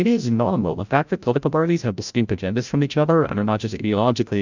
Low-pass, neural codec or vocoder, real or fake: 7.2 kHz; codec, 16 kHz, 0.5 kbps, FreqCodec, larger model; fake